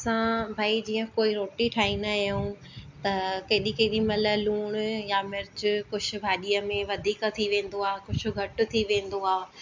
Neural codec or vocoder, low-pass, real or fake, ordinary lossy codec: none; 7.2 kHz; real; MP3, 64 kbps